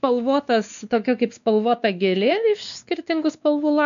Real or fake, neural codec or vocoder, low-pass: fake; codec, 16 kHz, 2 kbps, X-Codec, WavLM features, trained on Multilingual LibriSpeech; 7.2 kHz